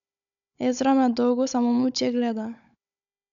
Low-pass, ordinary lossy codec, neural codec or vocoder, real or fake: 7.2 kHz; none; codec, 16 kHz, 16 kbps, FunCodec, trained on Chinese and English, 50 frames a second; fake